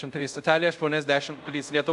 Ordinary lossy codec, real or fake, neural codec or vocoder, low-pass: MP3, 96 kbps; fake; codec, 24 kHz, 0.5 kbps, DualCodec; 10.8 kHz